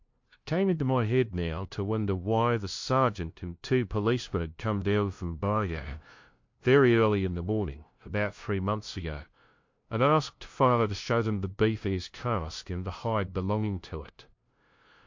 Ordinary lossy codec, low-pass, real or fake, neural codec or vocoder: MP3, 48 kbps; 7.2 kHz; fake; codec, 16 kHz, 0.5 kbps, FunCodec, trained on LibriTTS, 25 frames a second